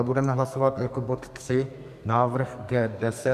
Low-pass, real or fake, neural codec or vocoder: 14.4 kHz; fake; codec, 44.1 kHz, 2.6 kbps, SNAC